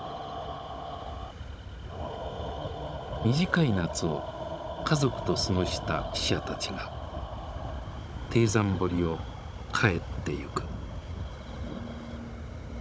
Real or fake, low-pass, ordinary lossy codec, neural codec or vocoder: fake; none; none; codec, 16 kHz, 16 kbps, FunCodec, trained on Chinese and English, 50 frames a second